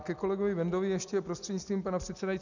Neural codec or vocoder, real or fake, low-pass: none; real; 7.2 kHz